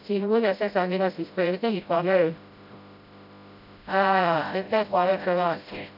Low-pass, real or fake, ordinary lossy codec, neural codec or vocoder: 5.4 kHz; fake; none; codec, 16 kHz, 0.5 kbps, FreqCodec, smaller model